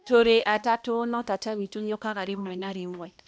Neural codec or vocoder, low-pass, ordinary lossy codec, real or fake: codec, 16 kHz, 1 kbps, X-Codec, HuBERT features, trained on balanced general audio; none; none; fake